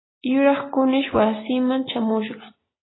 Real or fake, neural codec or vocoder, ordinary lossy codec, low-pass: real; none; AAC, 16 kbps; 7.2 kHz